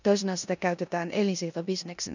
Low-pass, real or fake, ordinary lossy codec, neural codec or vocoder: 7.2 kHz; fake; none; codec, 16 kHz in and 24 kHz out, 0.9 kbps, LongCat-Audio-Codec, four codebook decoder